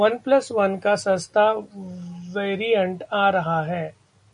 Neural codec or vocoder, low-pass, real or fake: none; 9.9 kHz; real